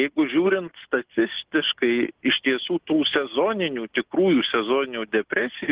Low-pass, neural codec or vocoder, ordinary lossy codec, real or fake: 3.6 kHz; none; Opus, 16 kbps; real